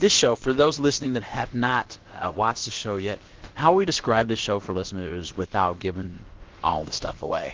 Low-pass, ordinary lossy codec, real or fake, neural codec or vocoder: 7.2 kHz; Opus, 16 kbps; fake; codec, 16 kHz, about 1 kbps, DyCAST, with the encoder's durations